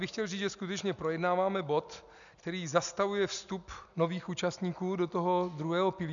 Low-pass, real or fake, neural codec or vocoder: 7.2 kHz; real; none